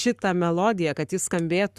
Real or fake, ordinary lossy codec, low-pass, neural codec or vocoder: fake; Opus, 64 kbps; 14.4 kHz; codec, 44.1 kHz, 7.8 kbps, Pupu-Codec